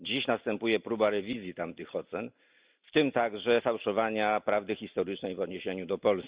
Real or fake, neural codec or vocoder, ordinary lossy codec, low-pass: real; none; Opus, 64 kbps; 3.6 kHz